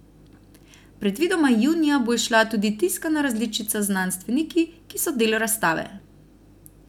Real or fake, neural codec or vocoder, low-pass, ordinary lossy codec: real; none; 19.8 kHz; none